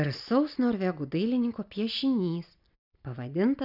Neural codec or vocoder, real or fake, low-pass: none; real; 5.4 kHz